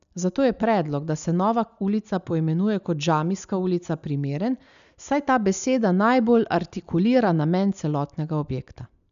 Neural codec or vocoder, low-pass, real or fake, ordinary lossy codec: none; 7.2 kHz; real; none